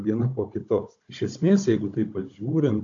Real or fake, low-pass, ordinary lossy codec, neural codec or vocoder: fake; 7.2 kHz; AAC, 48 kbps; codec, 16 kHz, 16 kbps, FunCodec, trained on Chinese and English, 50 frames a second